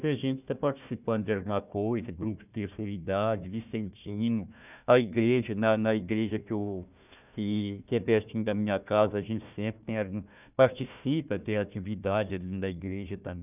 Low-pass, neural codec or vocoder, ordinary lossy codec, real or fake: 3.6 kHz; codec, 16 kHz, 1 kbps, FunCodec, trained on Chinese and English, 50 frames a second; none; fake